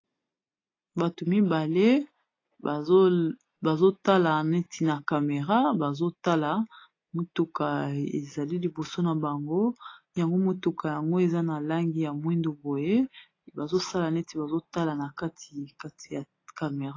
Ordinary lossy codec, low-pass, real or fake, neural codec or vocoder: AAC, 32 kbps; 7.2 kHz; real; none